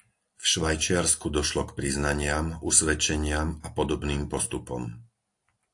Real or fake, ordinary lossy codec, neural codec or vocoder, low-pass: real; AAC, 64 kbps; none; 10.8 kHz